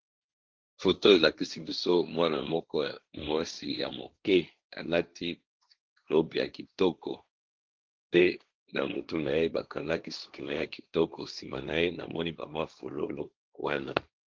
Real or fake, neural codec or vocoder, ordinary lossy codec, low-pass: fake; codec, 16 kHz, 1.1 kbps, Voila-Tokenizer; Opus, 24 kbps; 7.2 kHz